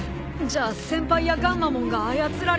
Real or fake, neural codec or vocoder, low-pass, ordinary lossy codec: real; none; none; none